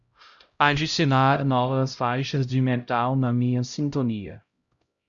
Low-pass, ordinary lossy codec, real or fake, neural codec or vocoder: 7.2 kHz; Opus, 64 kbps; fake; codec, 16 kHz, 0.5 kbps, X-Codec, HuBERT features, trained on LibriSpeech